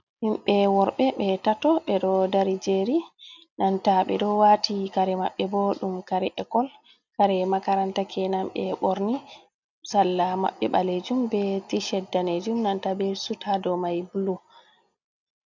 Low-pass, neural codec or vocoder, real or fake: 7.2 kHz; none; real